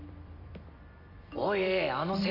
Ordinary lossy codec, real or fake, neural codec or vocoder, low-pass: none; fake; vocoder, 44.1 kHz, 128 mel bands every 256 samples, BigVGAN v2; 5.4 kHz